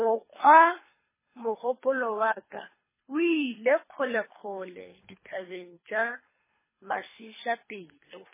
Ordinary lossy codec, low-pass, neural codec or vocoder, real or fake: MP3, 16 kbps; 3.6 kHz; codec, 24 kHz, 3 kbps, HILCodec; fake